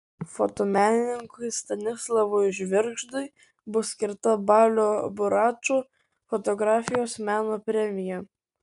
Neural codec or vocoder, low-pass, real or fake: none; 10.8 kHz; real